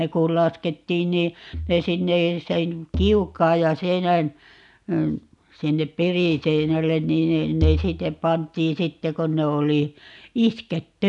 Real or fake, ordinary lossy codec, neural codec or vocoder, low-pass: real; none; none; 10.8 kHz